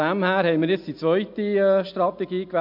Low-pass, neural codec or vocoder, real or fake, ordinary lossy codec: 5.4 kHz; none; real; MP3, 48 kbps